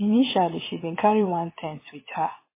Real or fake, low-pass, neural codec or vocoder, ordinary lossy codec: fake; 3.6 kHz; vocoder, 22.05 kHz, 80 mel bands, Vocos; MP3, 16 kbps